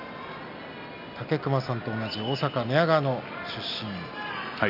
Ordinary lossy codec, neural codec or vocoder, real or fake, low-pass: none; none; real; 5.4 kHz